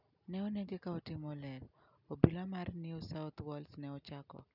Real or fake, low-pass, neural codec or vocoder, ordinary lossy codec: real; 5.4 kHz; none; none